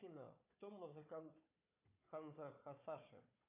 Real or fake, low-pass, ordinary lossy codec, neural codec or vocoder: fake; 3.6 kHz; AAC, 32 kbps; codec, 16 kHz, 4 kbps, FunCodec, trained on Chinese and English, 50 frames a second